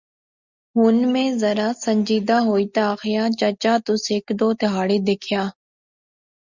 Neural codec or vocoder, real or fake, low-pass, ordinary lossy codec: none; real; 7.2 kHz; Opus, 64 kbps